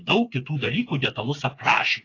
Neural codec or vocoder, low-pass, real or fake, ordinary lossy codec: codec, 16 kHz, 4 kbps, FreqCodec, smaller model; 7.2 kHz; fake; AAC, 32 kbps